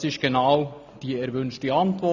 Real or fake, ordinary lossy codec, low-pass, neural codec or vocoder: real; none; 7.2 kHz; none